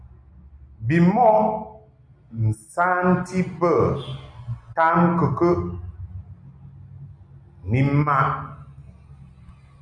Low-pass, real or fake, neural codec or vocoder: 9.9 kHz; real; none